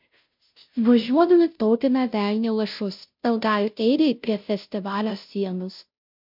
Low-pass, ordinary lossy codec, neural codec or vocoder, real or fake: 5.4 kHz; MP3, 48 kbps; codec, 16 kHz, 0.5 kbps, FunCodec, trained on Chinese and English, 25 frames a second; fake